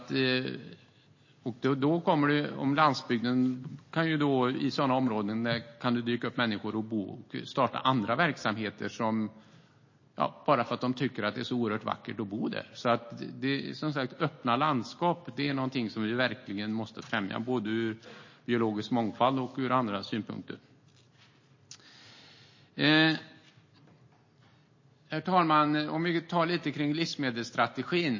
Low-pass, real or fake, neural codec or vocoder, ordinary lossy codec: 7.2 kHz; real; none; MP3, 32 kbps